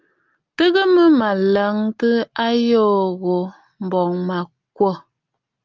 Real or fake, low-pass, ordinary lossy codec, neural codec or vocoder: real; 7.2 kHz; Opus, 24 kbps; none